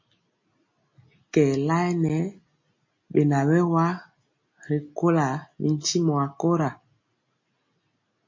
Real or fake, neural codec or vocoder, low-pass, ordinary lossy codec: real; none; 7.2 kHz; MP3, 32 kbps